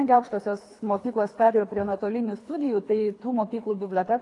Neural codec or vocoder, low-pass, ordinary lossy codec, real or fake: codec, 24 kHz, 3 kbps, HILCodec; 10.8 kHz; AAC, 48 kbps; fake